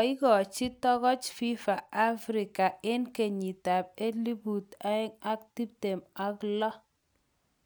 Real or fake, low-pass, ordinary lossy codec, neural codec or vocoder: real; none; none; none